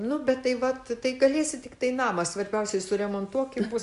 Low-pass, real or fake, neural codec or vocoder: 10.8 kHz; real; none